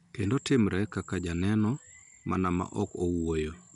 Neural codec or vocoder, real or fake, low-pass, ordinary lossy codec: none; real; 10.8 kHz; none